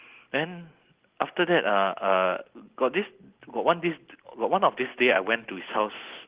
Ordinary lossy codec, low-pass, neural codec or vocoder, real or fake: Opus, 16 kbps; 3.6 kHz; none; real